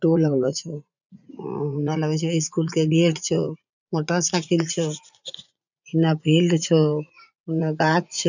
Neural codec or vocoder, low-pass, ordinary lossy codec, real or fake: vocoder, 44.1 kHz, 80 mel bands, Vocos; 7.2 kHz; none; fake